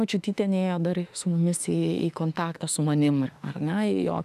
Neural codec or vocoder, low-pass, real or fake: autoencoder, 48 kHz, 32 numbers a frame, DAC-VAE, trained on Japanese speech; 14.4 kHz; fake